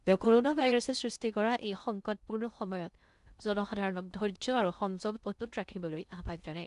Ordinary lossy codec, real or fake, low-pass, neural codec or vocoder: none; fake; 10.8 kHz; codec, 16 kHz in and 24 kHz out, 0.6 kbps, FocalCodec, streaming, 2048 codes